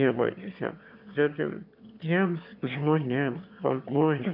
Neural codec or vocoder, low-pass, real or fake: autoencoder, 22.05 kHz, a latent of 192 numbers a frame, VITS, trained on one speaker; 5.4 kHz; fake